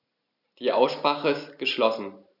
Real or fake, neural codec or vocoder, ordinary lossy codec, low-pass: real; none; none; 5.4 kHz